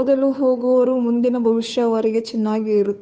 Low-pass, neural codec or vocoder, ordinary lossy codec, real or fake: none; codec, 16 kHz, 2 kbps, FunCodec, trained on Chinese and English, 25 frames a second; none; fake